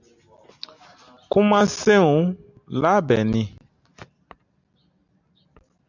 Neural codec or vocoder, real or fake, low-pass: none; real; 7.2 kHz